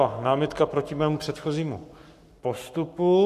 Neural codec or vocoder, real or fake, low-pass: codec, 44.1 kHz, 7.8 kbps, Pupu-Codec; fake; 14.4 kHz